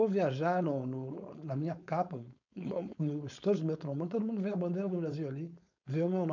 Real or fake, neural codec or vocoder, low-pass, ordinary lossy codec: fake; codec, 16 kHz, 4.8 kbps, FACodec; 7.2 kHz; none